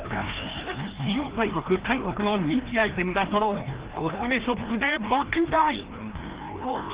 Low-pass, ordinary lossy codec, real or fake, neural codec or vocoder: 3.6 kHz; Opus, 16 kbps; fake; codec, 16 kHz, 1 kbps, FreqCodec, larger model